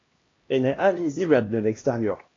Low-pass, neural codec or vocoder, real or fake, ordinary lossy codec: 7.2 kHz; codec, 16 kHz, 1 kbps, X-Codec, HuBERT features, trained on LibriSpeech; fake; AAC, 32 kbps